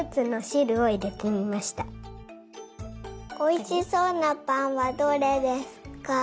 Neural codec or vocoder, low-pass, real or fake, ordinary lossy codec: none; none; real; none